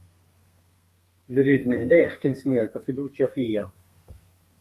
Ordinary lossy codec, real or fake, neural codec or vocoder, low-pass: Opus, 64 kbps; fake; codec, 32 kHz, 1.9 kbps, SNAC; 14.4 kHz